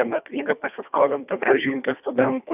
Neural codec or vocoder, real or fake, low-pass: codec, 24 kHz, 1.5 kbps, HILCodec; fake; 3.6 kHz